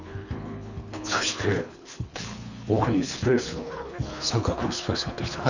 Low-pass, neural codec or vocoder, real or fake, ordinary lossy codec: 7.2 kHz; codec, 24 kHz, 3 kbps, HILCodec; fake; none